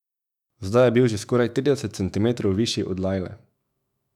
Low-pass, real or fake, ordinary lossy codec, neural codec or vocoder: 19.8 kHz; fake; none; autoencoder, 48 kHz, 128 numbers a frame, DAC-VAE, trained on Japanese speech